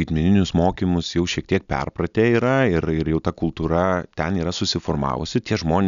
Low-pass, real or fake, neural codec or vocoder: 7.2 kHz; real; none